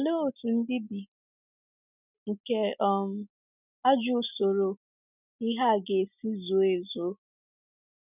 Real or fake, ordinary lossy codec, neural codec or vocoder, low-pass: real; none; none; 3.6 kHz